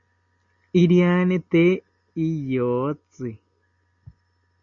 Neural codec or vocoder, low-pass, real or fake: none; 7.2 kHz; real